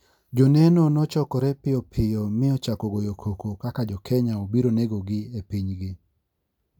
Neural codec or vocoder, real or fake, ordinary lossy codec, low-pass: none; real; none; 19.8 kHz